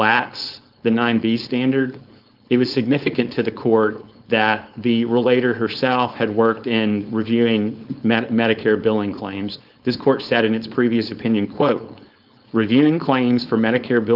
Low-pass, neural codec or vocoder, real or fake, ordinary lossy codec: 5.4 kHz; codec, 16 kHz, 4.8 kbps, FACodec; fake; Opus, 24 kbps